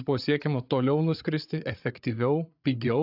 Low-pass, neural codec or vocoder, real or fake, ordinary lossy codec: 5.4 kHz; codec, 16 kHz, 8 kbps, FreqCodec, larger model; fake; AAC, 48 kbps